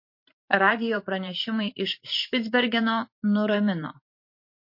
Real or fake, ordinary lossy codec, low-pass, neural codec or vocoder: fake; MP3, 32 kbps; 5.4 kHz; vocoder, 44.1 kHz, 128 mel bands every 512 samples, BigVGAN v2